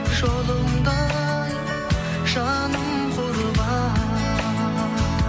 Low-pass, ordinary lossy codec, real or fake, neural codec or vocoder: none; none; real; none